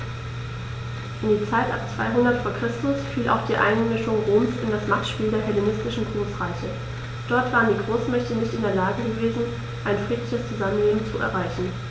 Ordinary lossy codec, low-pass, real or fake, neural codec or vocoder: none; none; real; none